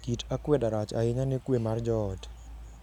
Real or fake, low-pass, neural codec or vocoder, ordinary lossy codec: real; 19.8 kHz; none; none